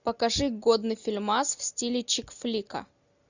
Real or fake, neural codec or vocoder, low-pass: real; none; 7.2 kHz